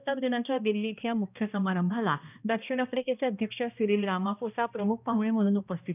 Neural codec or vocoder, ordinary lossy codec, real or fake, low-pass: codec, 16 kHz, 1 kbps, X-Codec, HuBERT features, trained on balanced general audio; none; fake; 3.6 kHz